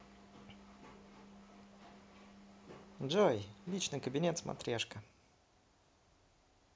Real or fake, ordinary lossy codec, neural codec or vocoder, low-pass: real; none; none; none